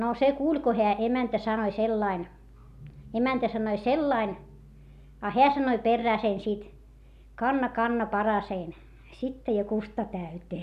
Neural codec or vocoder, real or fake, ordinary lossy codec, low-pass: none; real; none; 14.4 kHz